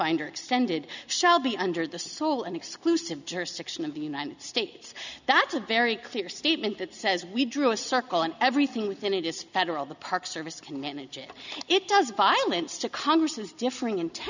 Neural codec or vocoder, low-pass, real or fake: none; 7.2 kHz; real